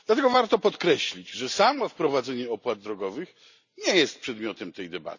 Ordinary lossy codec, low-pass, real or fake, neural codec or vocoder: none; 7.2 kHz; real; none